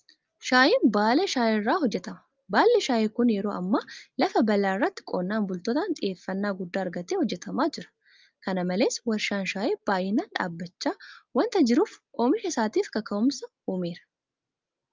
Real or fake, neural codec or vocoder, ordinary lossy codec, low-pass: real; none; Opus, 24 kbps; 7.2 kHz